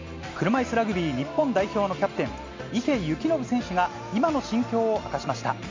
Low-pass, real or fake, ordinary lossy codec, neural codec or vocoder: 7.2 kHz; real; MP3, 48 kbps; none